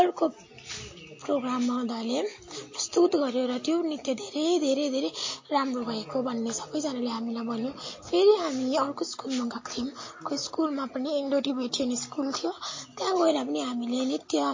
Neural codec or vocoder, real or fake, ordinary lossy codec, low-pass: none; real; MP3, 32 kbps; 7.2 kHz